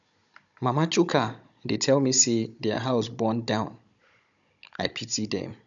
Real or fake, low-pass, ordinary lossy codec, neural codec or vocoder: fake; 7.2 kHz; none; codec, 16 kHz, 16 kbps, FunCodec, trained on Chinese and English, 50 frames a second